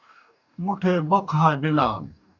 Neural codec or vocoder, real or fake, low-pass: codec, 44.1 kHz, 2.6 kbps, DAC; fake; 7.2 kHz